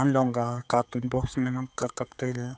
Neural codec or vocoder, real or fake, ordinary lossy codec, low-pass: codec, 16 kHz, 4 kbps, X-Codec, HuBERT features, trained on general audio; fake; none; none